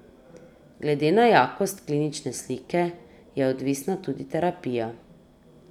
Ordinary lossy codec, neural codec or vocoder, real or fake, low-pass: none; none; real; 19.8 kHz